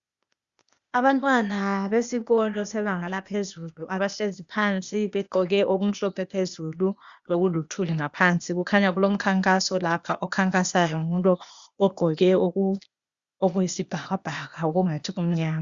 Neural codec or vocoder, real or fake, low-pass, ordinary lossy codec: codec, 16 kHz, 0.8 kbps, ZipCodec; fake; 7.2 kHz; Opus, 64 kbps